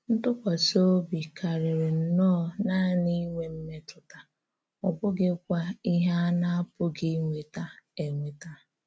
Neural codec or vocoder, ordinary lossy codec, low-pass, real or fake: none; none; none; real